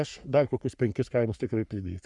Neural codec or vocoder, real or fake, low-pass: codec, 44.1 kHz, 3.4 kbps, Pupu-Codec; fake; 10.8 kHz